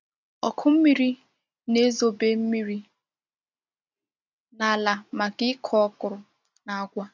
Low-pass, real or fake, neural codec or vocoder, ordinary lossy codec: 7.2 kHz; real; none; none